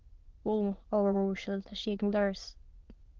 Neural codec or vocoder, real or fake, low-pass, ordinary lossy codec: autoencoder, 22.05 kHz, a latent of 192 numbers a frame, VITS, trained on many speakers; fake; 7.2 kHz; Opus, 16 kbps